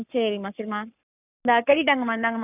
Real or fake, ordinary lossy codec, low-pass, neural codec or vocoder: fake; none; 3.6 kHz; codec, 44.1 kHz, 7.8 kbps, Pupu-Codec